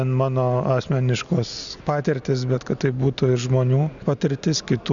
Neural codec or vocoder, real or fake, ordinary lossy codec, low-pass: none; real; MP3, 96 kbps; 7.2 kHz